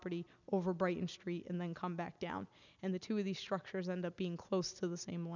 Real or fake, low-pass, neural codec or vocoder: real; 7.2 kHz; none